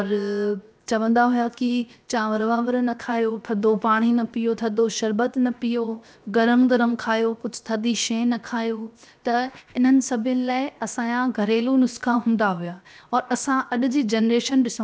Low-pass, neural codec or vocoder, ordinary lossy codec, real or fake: none; codec, 16 kHz, 0.7 kbps, FocalCodec; none; fake